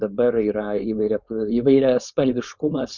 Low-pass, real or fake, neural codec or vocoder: 7.2 kHz; fake; codec, 16 kHz, 4.8 kbps, FACodec